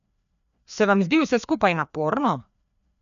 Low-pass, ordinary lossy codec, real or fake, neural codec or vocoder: 7.2 kHz; none; fake; codec, 16 kHz, 2 kbps, FreqCodec, larger model